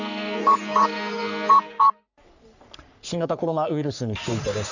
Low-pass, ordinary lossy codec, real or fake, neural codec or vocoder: 7.2 kHz; none; fake; codec, 44.1 kHz, 3.4 kbps, Pupu-Codec